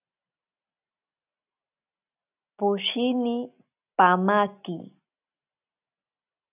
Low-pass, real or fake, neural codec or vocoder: 3.6 kHz; real; none